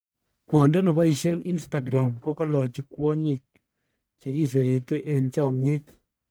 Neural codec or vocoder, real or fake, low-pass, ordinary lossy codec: codec, 44.1 kHz, 1.7 kbps, Pupu-Codec; fake; none; none